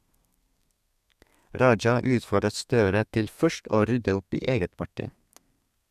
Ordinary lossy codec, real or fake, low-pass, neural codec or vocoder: none; fake; 14.4 kHz; codec, 32 kHz, 1.9 kbps, SNAC